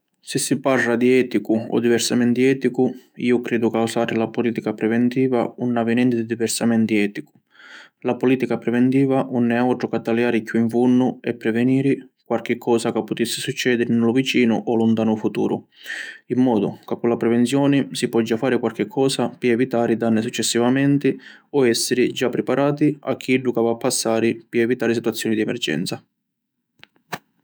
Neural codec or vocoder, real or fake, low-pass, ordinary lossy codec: autoencoder, 48 kHz, 128 numbers a frame, DAC-VAE, trained on Japanese speech; fake; none; none